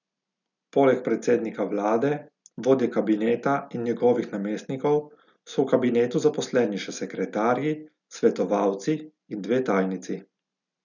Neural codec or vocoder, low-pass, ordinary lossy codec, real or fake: none; 7.2 kHz; none; real